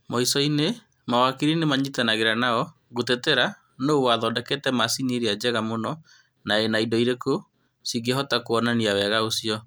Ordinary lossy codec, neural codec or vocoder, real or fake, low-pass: none; none; real; none